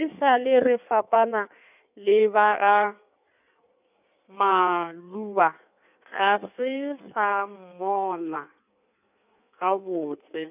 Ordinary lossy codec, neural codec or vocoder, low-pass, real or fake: none; codec, 16 kHz in and 24 kHz out, 1.1 kbps, FireRedTTS-2 codec; 3.6 kHz; fake